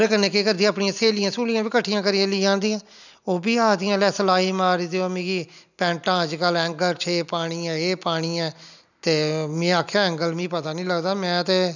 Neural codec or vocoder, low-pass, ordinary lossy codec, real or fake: none; 7.2 kHz; none; real